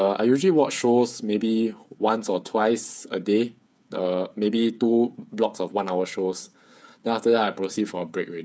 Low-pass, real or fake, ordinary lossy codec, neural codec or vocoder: none; fake; none; codec, 16 kHz, 8 kbps, FreqCodec, smaller model